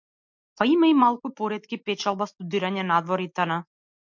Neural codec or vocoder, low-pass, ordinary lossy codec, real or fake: none; 7.2 kHz; MP3, 64 kbps; real